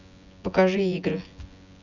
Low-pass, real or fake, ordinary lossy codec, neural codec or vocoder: 7.2 kHz; fake; none; vocoder, 24 kHz, 100 mel bands, Vocos